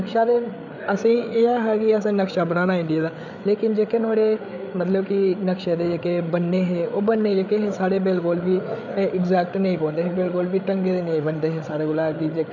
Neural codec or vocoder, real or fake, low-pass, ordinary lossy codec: codec, 16 kHz, 8 kbps, FreqCodec, larger model; fake; 7.2 kHz; none